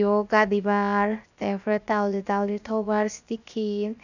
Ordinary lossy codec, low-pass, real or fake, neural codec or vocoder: none; 7.2 kHz; fake; codec, 16 kHz, 0.7 kbps, FocalCodec